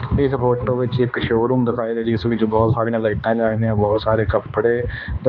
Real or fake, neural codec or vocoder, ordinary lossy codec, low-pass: fake; codec, 16 kHz, 2 kbps, X-Codec, HuBERT features, trained on balanced general audio; none; 7.2 kHz